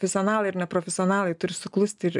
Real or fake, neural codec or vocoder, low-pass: real; none; 10.8 kHz